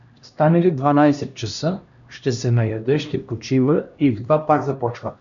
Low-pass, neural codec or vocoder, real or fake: 7.2 kHz; codec, 16 kHz, 1 kbps, X-Codec, HuBERT features, trained on LibriSpeech; fake